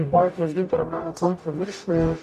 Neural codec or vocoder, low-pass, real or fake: codec, 44.1 kHz, 0.9 kbps, DAC; 14.4 kHz; fake